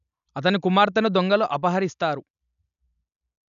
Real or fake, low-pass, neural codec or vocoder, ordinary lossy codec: real; 7.2 kHz; none; none